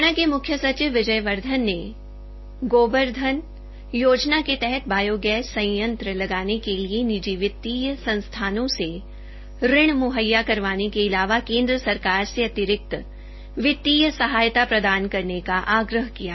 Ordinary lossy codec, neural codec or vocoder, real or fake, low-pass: MP3, 24 kbps; none; real; 7.2 kHz